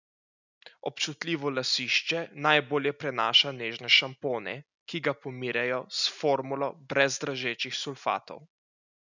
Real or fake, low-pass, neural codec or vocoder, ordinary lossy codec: real; 7.2 kHz; none; none